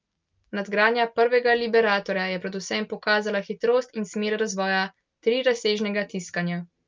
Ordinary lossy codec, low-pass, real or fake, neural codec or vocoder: Opus, 24 kbps; 7.2 kHz; real; none